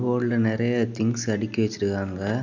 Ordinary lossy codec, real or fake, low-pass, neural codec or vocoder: none; real; 7.2 kHz; none